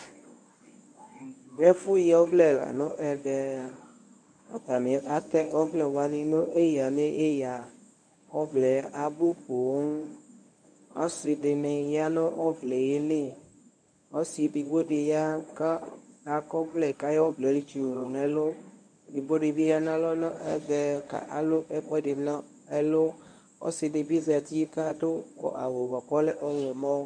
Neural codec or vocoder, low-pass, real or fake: codec, 24 kHz, 0.9 kbps, WavTokenizer, medium speech release version 1; 9.9 kHz; fake